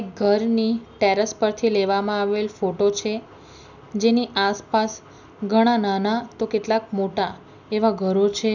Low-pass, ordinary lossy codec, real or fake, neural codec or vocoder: 7.2 kHz; none; real; none